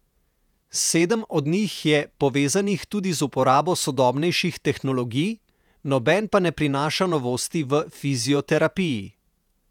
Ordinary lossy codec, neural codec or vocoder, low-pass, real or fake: none; vocoder, 48 kHz, 128 mel bands, Vocos; 19.8 kHz; fake